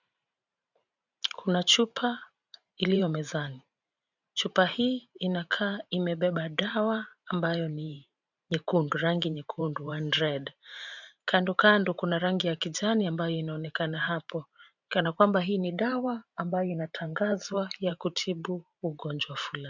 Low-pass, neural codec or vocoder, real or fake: 7.2 kHz; vocoder, 44.1 kHz, 128 mel bands every 512 samples, BigVGAN v2; fake